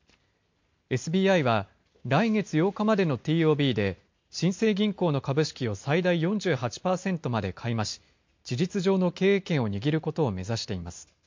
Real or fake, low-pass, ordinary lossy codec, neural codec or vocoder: real; 7.2 kHz; MP3, 48 kbps; none